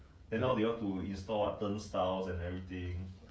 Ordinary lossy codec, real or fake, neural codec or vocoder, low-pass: none; fake; codec, 16 kHz, 16 kbps, FreqCodec, smaller model; none